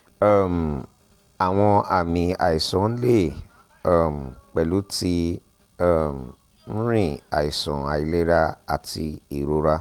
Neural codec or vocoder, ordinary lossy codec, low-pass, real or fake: none; Opus, 32 kbps; 19.8 kHz; real